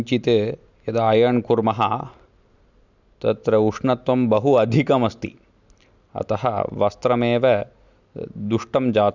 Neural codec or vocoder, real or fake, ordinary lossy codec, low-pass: none; real; none; 7.2 kHz